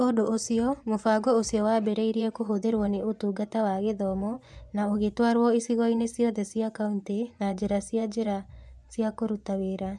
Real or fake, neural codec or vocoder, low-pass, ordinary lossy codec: fake; vocoder, 24 kHz, 100 mel bands, Vocos; none; none